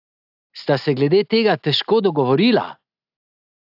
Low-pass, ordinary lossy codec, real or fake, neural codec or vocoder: 5.4 kHz; AAC, 48 kbps; real; none